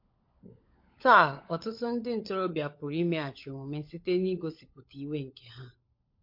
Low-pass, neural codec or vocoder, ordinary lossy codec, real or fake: 5.4 kHz; codec, 16 kHz, 16 kbps, FunCodec, trained on LibriTTS, 50 frames a second; MP3, 32 kbps; fake